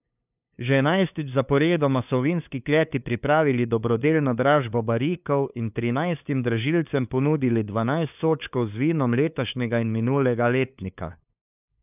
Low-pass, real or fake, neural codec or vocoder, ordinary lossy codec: 3.6 kHz; fake; codec, 16 kHz, 2 kbps, FunCodec, trained on LibriTTS, 25 frames a second; none